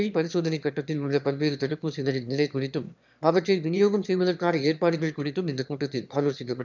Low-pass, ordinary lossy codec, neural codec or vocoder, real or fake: 7.2 kHz; none; autoencoder, 22.05 kHz, a latent of 192 numbers a frame, VITS, trained on one speaker; fake